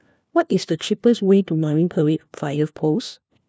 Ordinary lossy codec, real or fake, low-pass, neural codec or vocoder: none; fake; none; codec, 16 kHz, 1 kbps, FunCodec, trained on LibriTTS, 50 frames a second